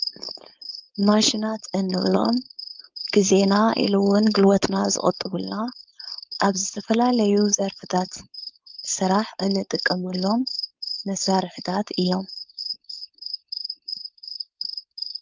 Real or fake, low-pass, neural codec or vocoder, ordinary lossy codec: fake; 7.2 kHz; codec, 16 kHz, 4.8 kbps, FACodec; Opus, 32 kbps